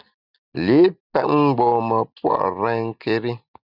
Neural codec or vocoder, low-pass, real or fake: none; 5.4 kHz; real